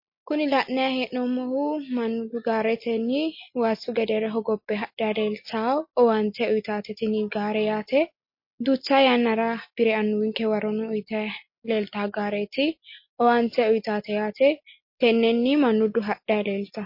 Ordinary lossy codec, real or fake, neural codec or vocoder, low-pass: MP3, 32 kbps; real; none; 5.4 kHz